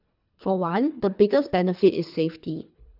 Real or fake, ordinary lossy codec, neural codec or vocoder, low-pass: fake; none; codec, 24 kHz, 3 kbps, HILCodec; 5.4 kHz